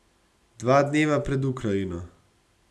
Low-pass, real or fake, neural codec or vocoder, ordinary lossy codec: none; real; none; none